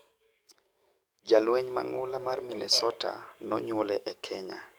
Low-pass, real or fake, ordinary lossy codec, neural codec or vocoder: none; fake; none; codec, 44.1 kHz, 7.8 kbps, DAC